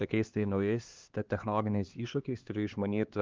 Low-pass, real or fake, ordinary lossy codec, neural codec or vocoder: 7.2 kHz; fake; Opus, 32 kbps; codec, 16 kHz, 2 kbps, X-Codec, HuBERT features, trained on LibriSpeech